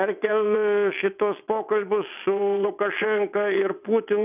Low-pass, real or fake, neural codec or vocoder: 3.6 kHz; fake; vocoder, 22.05 kHz, 80 mel bands, WaveNeXt